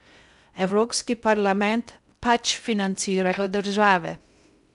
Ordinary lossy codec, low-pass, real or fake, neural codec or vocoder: none; 10.8 kHz; fake; codec, 16 kHz in and 24 kHz out, 0.6 kbps, FocalCodec, streaming, 2048 codes